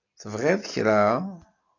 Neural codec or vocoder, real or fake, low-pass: none; real; 7.2 kHz